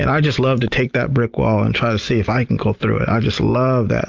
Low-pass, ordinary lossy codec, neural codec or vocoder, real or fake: 7.2 kHz; Opus, 24 kbps; none; real